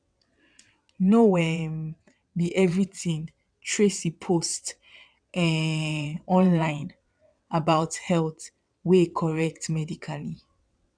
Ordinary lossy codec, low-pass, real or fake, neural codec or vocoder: none; 9.9 kHz; fake; vocoder, 22.05 kHz, 80 mel bands, WaveNeXt